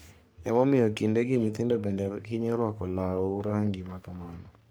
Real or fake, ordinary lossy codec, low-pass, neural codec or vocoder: fake; none; none; codec, 44.1 kHz, 3.4 kbps, Pupu-Codec